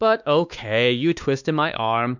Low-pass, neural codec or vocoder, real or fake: 7.2 kHz; codec, 16 kHz, 2 kbps, X-Codec, WavLM features, trained on Multilingual LibriSpeech; fake